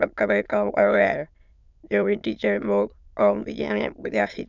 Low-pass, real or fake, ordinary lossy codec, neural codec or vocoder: 7.2 kHz; fake; none; autoencoder, 22.05 kHz, a latent of 192 numbers a frame, VITS, trained on many speakers